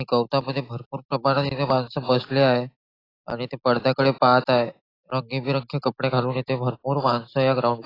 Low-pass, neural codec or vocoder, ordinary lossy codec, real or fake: 5.4 kHz; none; AAC, 24 kbps; real